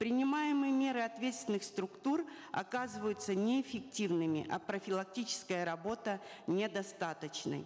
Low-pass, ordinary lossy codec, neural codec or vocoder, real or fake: none; none; none; real